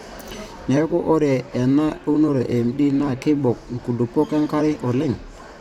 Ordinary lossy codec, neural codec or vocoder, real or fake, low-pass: none; vocoder, 44.1 kHz, 128 mel bands, Pupu-Vocoder; fake; 19.8 kHz